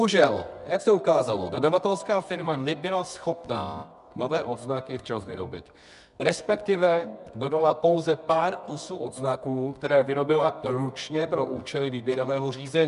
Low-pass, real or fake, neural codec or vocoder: 10.8 kHz; fake; codec, 24 kHz, 0.9 kbps, WavTokenizer, medium music audio release